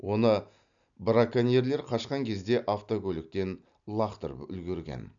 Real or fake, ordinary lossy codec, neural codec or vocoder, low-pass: real; none; none; 7.2 kHz